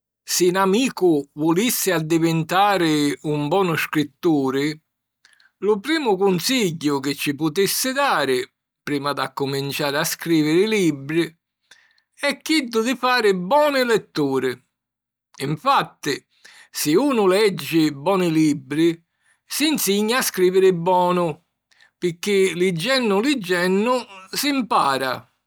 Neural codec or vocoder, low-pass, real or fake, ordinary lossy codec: none; none; real; none